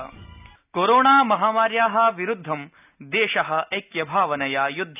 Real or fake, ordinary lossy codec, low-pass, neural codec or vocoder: real; none; 3.6 kHz; none